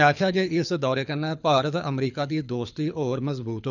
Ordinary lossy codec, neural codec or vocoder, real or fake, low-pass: none; codec, 24 kHz, 6 kbps, HILCodec; fake; 7.2 kHz